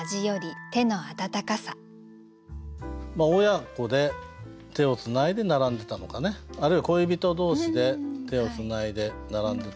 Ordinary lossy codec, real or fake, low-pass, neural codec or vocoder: none; real; none; none